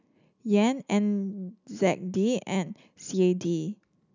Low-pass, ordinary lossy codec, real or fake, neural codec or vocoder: 7.2 kHz; none; real; none